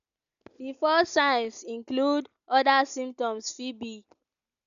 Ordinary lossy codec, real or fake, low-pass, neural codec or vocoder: none; real; 7.2 kHz; none